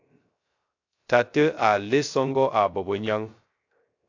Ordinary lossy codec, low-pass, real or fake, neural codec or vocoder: AAC, 48 kbps; 7.2 kHz; fake; codec, 16 kHz, 0.3 kbps, FocalCodec